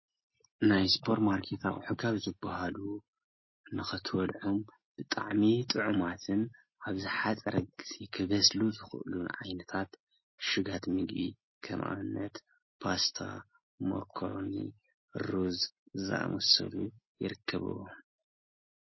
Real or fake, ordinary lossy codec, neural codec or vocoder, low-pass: real; MP3, 24 kbps; none; 7.2 kHz